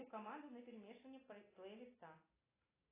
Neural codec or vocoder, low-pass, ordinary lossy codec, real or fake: none; 3.6 kHz; AAC, 16 kbps; real